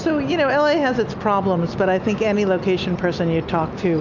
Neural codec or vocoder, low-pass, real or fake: none; 7.2 kHz; real